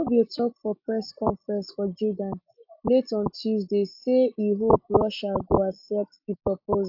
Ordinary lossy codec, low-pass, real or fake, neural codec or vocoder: AAC, 48 kbps; 5.4 kHz; real; none